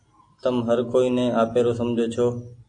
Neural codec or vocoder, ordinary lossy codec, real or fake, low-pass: none; AAC, 48 kbps; real; 9.9 kHz